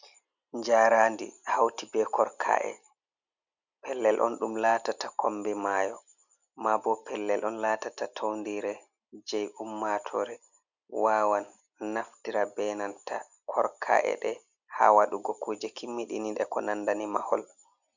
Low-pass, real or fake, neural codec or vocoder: 7.2 kHz; real; none